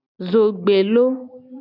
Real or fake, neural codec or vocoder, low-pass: real; none; 5.4 kHz